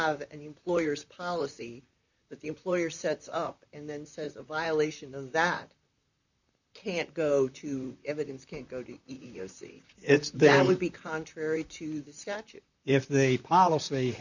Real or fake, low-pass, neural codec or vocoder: fake; 7.2 kHz; vocoder, 44.1 kHz, 128 mel bands, Pupu-Vocoder